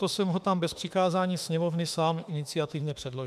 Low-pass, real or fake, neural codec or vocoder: 14.4 kHz; fake; autoencoder, 48 kHz, 32 numbers a frame, DAC-VAE, trained on Japanese speech